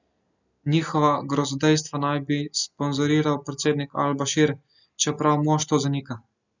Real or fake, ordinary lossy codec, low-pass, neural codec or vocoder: real; none; 7.2 kHz; none